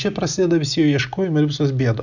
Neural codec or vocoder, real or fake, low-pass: none; real; 7.2 kHz